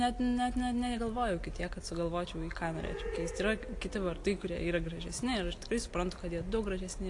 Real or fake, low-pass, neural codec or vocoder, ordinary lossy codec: real; 10.8 kHz; none; AAC, 48 kbps